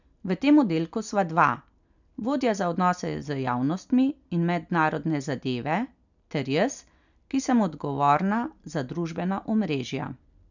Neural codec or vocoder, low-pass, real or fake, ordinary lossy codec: none; 7.2 kHz; real; none